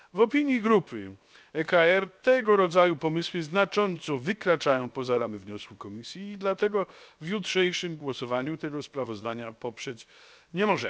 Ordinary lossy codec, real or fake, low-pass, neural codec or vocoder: none; fake; none; codec, 16 kHz, 0.7 kbps, FocalCodec